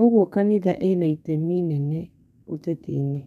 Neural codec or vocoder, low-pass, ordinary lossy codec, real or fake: codec, 32 kHz, 1.9 kbps, SNAC; 14.4 kHz; none; fake